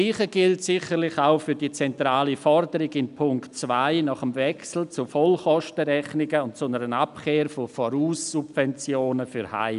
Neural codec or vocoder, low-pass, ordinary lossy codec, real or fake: none; 10.8 kHz; none; real